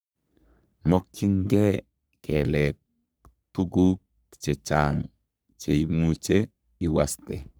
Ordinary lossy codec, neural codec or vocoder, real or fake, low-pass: none; codec, 44.1 kHz, 3.4 kbps, Pupu-Codec; fake; none